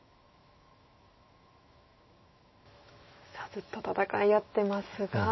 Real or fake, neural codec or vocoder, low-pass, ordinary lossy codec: fake; autoencoder, 48 kHz, 128 numbers a frame, DAC-VAE, trained on Japanese speech; 7.2 kHz; MP3, 24 kbps